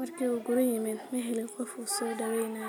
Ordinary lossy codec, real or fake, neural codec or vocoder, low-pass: none; real; none; none